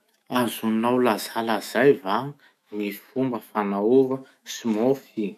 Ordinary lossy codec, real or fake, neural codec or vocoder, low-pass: none; fake; autoencoder, 48 kHz, 128 numbers a frame, DAC-VAE, trained on Japanese speech; 14.4 kHz